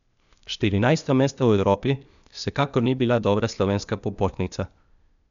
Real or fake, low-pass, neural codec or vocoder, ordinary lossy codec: fake; 7.2 kHz; codec, 16 kHz, 0.8 kbps, ZipCodec; none